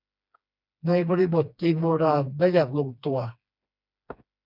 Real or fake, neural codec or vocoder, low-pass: fake; codec, 16 kHz, 2 kbps, FreqCodec, smaller model; 5.4 kHz